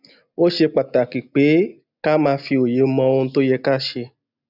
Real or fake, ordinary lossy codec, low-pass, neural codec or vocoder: real; none; 5.4 kHz; none